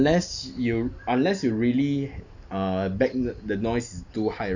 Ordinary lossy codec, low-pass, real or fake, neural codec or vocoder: none; 7.2 kHz; real; none